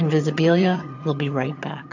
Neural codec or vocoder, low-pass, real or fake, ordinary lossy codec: vocoder, 22.05 kHz, 80 mel bands, HiFi-GAN; 7.2 kHz; fake; AAC, 48 kbps